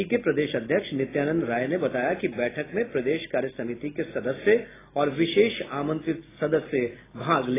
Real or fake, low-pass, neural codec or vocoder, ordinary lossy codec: real; 3.6 kHz; none; AAC, 16 kbps